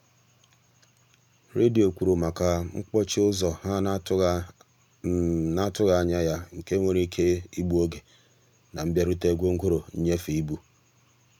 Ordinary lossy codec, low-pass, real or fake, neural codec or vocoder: none; 19.8 kHz; real; none